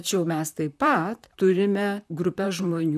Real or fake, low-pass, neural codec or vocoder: fake; 14.4 kHz; vocoder, 44.1 kHz, 128 mel bands, Pupu-Vocoder